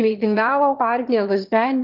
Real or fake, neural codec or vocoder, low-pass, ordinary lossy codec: fake; autoencoder, 22.05 kHz, a latent of 192 numbers a frame, VITS, trained on one speaker; 5.4 kHz; Opus, 32 kbps